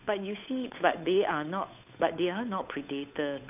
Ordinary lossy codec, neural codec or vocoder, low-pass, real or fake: none; codec, 16 kHz, 8 kbps, FunCodec, trained on Chinese and English, 25 frames a second; 3.6 kHz; fake